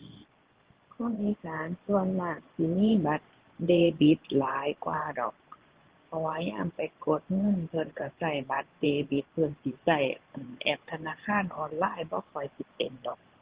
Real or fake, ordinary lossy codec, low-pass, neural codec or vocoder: fake; Opus, 16 kbps; 3.6 kHz; vocoder, 24 kHz, 100 mel bands, Vocos